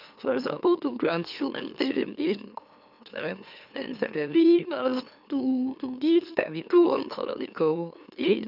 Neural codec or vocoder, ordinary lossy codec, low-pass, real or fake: autoencoder, 44.1 kHz, a latent of 192 numbers a frame, MeloTTS; none; 5.4 kHz; fake